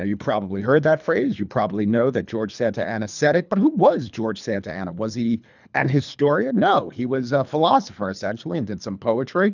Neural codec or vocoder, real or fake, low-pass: codec, 24 kHz, 3 kbps, HILCodec; fake; 7.2 kHz